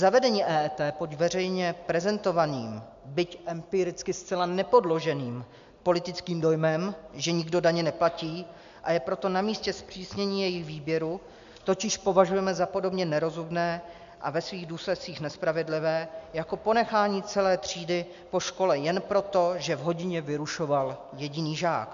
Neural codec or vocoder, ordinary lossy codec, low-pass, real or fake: none; MP3, 64 kbps; 7.2 kHz; real